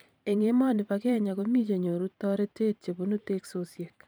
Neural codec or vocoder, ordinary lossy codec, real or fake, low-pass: vocoder, 44.1 kHz, 128 mel bands every 512 samples, BigVGAN v2; none; fake; none